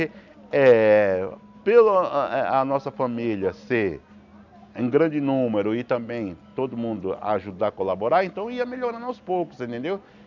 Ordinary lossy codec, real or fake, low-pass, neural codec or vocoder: none; real; 7.2 kHz; none